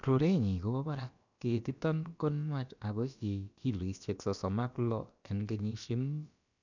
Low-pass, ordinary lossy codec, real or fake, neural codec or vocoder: 7.2 kHz; none; fake; codec, 16 kHz, about 1 kbps, DyCAST, with the encoder's durations